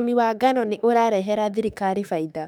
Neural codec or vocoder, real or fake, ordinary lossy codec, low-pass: autoencoder, 48 kHz, 32 numbers a frame, DAC-VAE, trained on Japanese speech; fake; none; 19.8 kHz